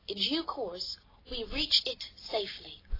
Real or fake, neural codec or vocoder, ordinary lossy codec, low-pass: real; none; AAC, 24 kbps; 5.4 kHz